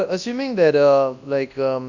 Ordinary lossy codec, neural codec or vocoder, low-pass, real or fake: none; codec, 24 kHz, 0.9 kbps, WavTokenizer, large speech release; 7.2 kHz; fake